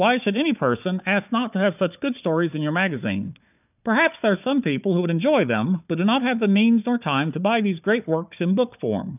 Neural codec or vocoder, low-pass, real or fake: codec, 16 kHz, 4 kbps, FunCodec, trained on Chinese and English, 50 frames a second; 3.6 kHz; fake